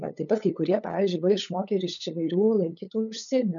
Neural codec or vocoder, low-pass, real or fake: codec, 16 kHz, 8 kbps, FunCodec, trained on LibriTTS, 25 frames a second; 7.2 kHz; fake